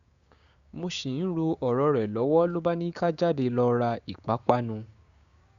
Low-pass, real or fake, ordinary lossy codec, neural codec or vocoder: 7.2 kHz; fake; Opus, 64 kbps; codec, 16 kHz, 6 kbps, DAC